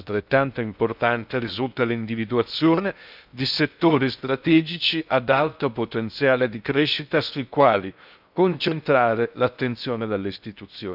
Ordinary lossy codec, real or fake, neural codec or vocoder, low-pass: none; fake; codec, 16 kHz in and 24 kHz out, 0.6 kbps, FocalCodec, streaming, 2048 codes; 5.4 kHz